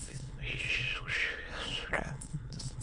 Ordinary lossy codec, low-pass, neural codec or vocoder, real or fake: MP3, 48 kbps; 9.9 kHz; autoencoder, 22.05 kHz, a latent of 192 numbers a frame, VITS, trained on many speakers; fake